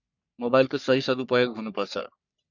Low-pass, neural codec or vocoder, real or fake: 7.2 kHz; codec, 44.1 kHz, 3.4 kbps, Pupu-Codec; fake